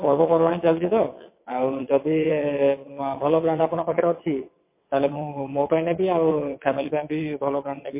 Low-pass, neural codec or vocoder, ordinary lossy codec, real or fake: 3.6 kHz; vocoder, 22.05 kHz, 80 mel bands, WaveNeXt; MP3, 24 kbps; fake